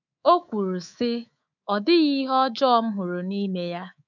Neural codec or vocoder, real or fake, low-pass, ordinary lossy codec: codec, 24 kHz, 3.1 kbps, DualCodec; fake; 7.2 kHz; none